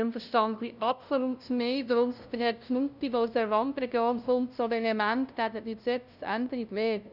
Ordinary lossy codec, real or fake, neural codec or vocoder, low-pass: none; fake; codec, 16 kHz, 0.5 kbps, FunCodec, trained on LibriTTS, 25 frames a second; 5.4 kHz